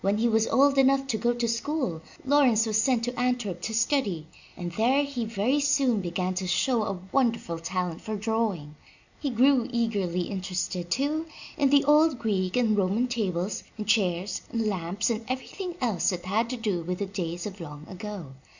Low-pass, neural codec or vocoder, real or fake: 7.2 kHz; none; real